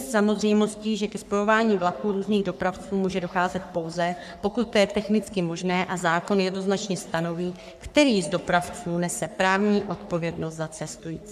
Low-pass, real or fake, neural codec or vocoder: 14.4 kHz; fake; codec, 44.1 kHz, 3.4 kbps, Pupu-Codec